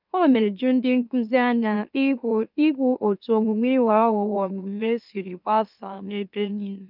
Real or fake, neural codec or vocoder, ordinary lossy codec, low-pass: fake; autoencoder, 44.1 kHz, a latent of 192 numbers a frame, MeloTTS; none; 5.4 kHz